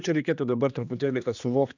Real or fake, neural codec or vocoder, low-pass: fake; codec, 16 kHz, 2 kbps, X-Codec, HuBERT features, trained on general audio; 7.2 kHz